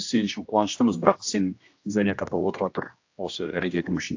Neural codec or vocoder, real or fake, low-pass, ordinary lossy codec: codec, 16 kHz, 1 kbps, X-Codec, HuBERT features, trained on general audio; fake; 7.2 kHz; AAC, 48 kbps